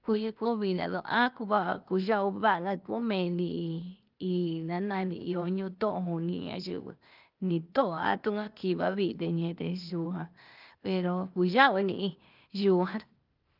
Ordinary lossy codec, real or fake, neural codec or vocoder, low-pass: Opus, 24 kbps; fake; codec, 16 kHz, 0.8 kbps, ZipCodec; 5.4 kHz